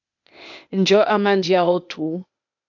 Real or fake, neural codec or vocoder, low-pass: fake; codec, 16 kHz, 0.8 kbps, ZipCodec; 7.2 kHz